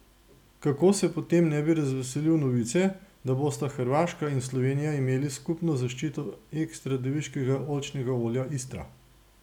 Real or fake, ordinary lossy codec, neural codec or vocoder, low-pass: real; none; none; 19.8 kHz